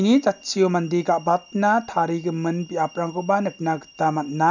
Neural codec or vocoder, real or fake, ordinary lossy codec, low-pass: none; real; none; 7.2 kHz